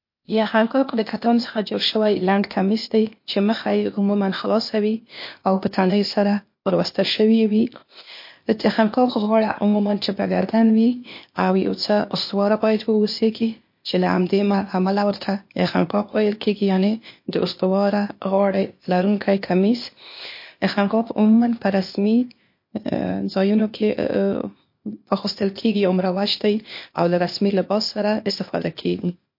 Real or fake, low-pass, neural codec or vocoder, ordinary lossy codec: fake; 5.4 kHz; codec, 16 kHz, 0.8 kbps, ZipCodec; MP3, 32 kbps